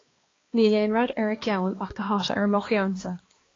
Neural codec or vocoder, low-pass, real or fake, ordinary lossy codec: codec, 16 kHz, 2 kbps, X-Codec, HuBERT features, trained on balanced general audio; 7.2 kHz; fake; AAC, 32 kbps